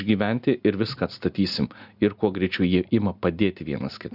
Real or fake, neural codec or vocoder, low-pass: real; none; 5.4 kHz